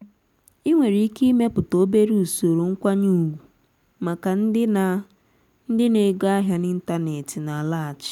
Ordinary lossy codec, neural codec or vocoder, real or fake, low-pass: none; none; real; 19.8 kHz